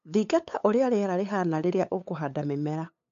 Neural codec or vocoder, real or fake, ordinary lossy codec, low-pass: codec, 16 kHz, 8 kbps, FunCodec, trained on LibriTTS, 25 frames a second; fake; MP3, 64 kbps; 7.2 kHz